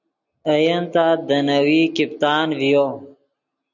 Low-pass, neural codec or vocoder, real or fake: 7.2 kHz; none; real